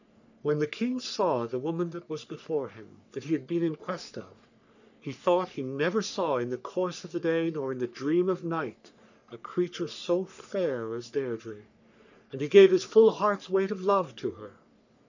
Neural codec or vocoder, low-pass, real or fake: codec, 44.1 kHz, 3.4 kbps, Pupu-Codec; 7.2 kHz; fake